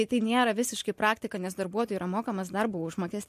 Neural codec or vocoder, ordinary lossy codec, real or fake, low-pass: none; MP3, 64 kbps; real; 14.4 kHz